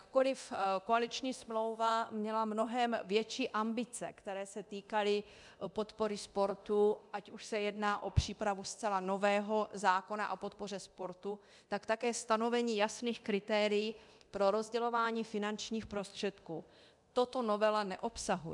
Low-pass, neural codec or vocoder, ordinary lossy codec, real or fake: 10.8 kHz; codec, 24 kHz, 0.9 kbps, DualCodec; MP3, 96 kbps; fake